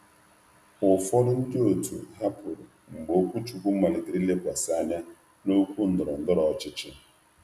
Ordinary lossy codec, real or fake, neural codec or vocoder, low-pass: none; real; none; 14.4 kHz